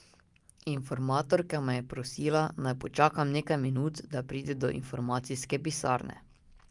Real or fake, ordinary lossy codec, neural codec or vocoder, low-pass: real; Opus, 32 kbps; none; 10.8 kHz